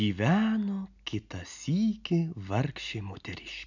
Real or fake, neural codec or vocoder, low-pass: real; none; 7.2 kHz